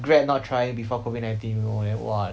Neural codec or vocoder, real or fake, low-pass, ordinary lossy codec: none; real; none; none